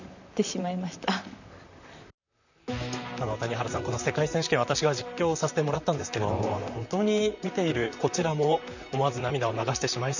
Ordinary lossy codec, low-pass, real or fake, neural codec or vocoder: none; 7.2 kHz; fake; vocoder, 44.1 kHz, 128 mel bands, Pupu-Vocoder